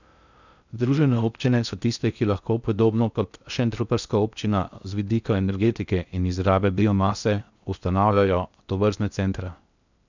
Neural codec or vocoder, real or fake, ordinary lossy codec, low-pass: codec, 16 kHz in and 24 kHz out, 0.6 kbps, FocalCodec, streaming, 2048 codes; fake; none; 7.2 kHz